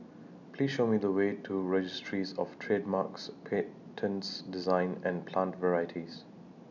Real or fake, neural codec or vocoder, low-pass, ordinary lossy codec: real; none; 7.2 kHz; none